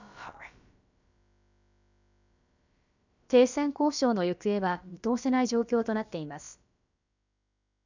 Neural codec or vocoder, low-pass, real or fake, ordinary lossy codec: codec, 16 kHz, about 1 kbps, DyCAST, with the encoder's durations; 7.2 kHz; fake; none